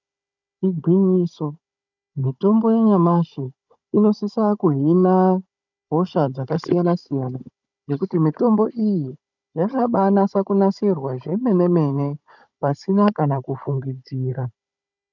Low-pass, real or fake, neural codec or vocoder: 7.2 kHz; fake; codec, 16 kHz, 16 kbps, FunCodec, trained on Chinese and English, 50 frames a second